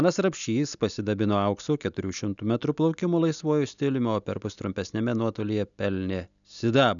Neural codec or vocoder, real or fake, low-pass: none; real; 7.2 kHz